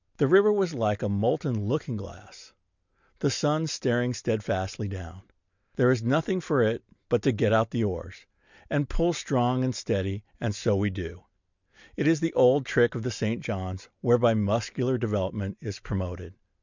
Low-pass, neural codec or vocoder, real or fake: 7.2 kHz; none; real